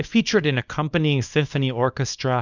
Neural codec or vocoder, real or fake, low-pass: codec, 24 kHz, 0.9 kbps, WavTokenizer, small release; fake; 7.2 kHz